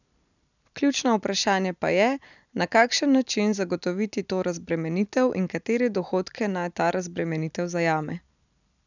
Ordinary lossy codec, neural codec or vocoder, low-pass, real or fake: none; none; 7.2 kHz; real